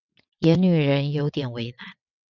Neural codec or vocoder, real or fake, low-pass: vocoder, 22.05 kHz, 80 mel bands, WaveNeXt; fake; 7.2 kHz